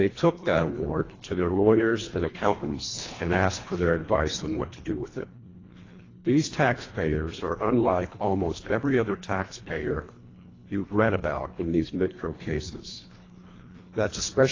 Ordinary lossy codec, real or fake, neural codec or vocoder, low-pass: AAC, 32 kbps; fake; codec, 24 kHz, 1.5 kbps, HILCodec; 7.2 kHz